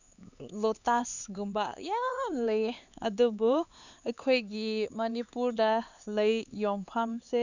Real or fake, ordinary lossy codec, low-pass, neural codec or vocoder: fake; none; 7.2 kHz; codec, 16 kHz, 4 kbps, X-Codec, HuBERT features, trained on LibriSpeech